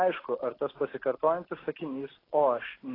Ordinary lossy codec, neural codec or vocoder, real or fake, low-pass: AAC, 24 kbps; none; real; 5.4 kHz